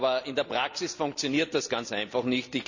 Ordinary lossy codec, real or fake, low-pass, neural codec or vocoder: none; real; 7.2 kHz; none